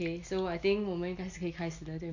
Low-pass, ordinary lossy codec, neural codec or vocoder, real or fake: 7.2 kHz; Opus, 64 kbps; none; real